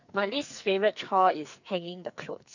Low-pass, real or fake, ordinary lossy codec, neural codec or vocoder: 7.2 kHz; fake; none; codec, 44.1 kHz, 2.6 kbps, SNAC